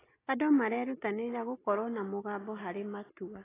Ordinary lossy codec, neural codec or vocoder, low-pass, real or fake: AAC, 16 kbps; none; 3.6 kHz; real